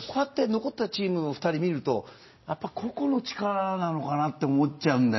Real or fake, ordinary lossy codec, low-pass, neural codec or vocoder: real; MP3, 24 kbps; 7.2 kHz; none